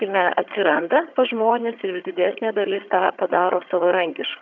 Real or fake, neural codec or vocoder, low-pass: fake; vocoder, 22.05 kHz, 80 mel bands, HiFi-GAN; 7.2 kHz